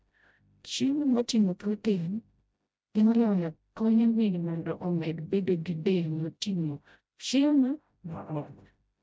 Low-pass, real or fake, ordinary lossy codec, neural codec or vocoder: none; fake; none; codec, 16 kHz, 0.5 kbps, FreqCodec, smaller model